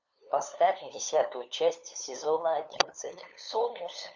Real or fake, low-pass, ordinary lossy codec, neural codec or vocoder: fake; 7.2 kHz; Opus, 64 kbps; codec, 16 kHz, 2 kbps, FunCodec, trained on LibriTTS, 25 frames a second